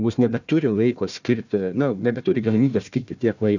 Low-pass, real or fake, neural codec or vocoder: 7.2 kHz; fake; codec, 16 kHz, 1 kbps, FunCodec, trained on Chinese and English, 50 frames a second